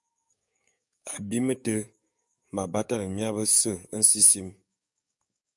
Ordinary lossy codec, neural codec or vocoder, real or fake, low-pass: MP3, 96 kbps; codec, 44.1 kHz, 7.8 kbps, DAC; fake; 10.8 kHz